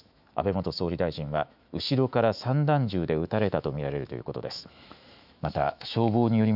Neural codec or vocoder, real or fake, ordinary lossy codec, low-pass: autoencoder, 48 kHz, 128 numbers a frame, DAC-VAE, trained on Japanese speech; fake; none; 5.4 kHz